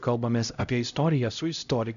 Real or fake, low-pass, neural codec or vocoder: fake; 7.2 kHz; codec, 16 kHz, 0.5 kbps, X-Codec, HuBERT features, trained on LibriSpeech